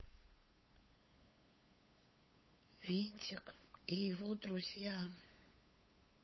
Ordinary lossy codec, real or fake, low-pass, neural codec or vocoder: MP3, 24 kbps; fake; 7.2 kHz; codec, 16 kHz, 8 kbps, FunCodec, trained on LibriTTS, 25 frames a second